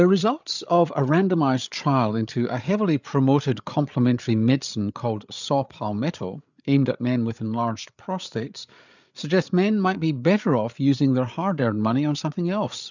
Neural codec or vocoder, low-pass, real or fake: codec, 16 kHz, 8 kbps, FreqCodec, larger model; 7.2 kHz; fake